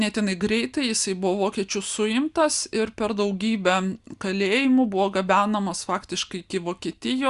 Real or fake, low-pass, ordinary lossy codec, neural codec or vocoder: real; 10.8 kHz; Opus, 64 kbps; none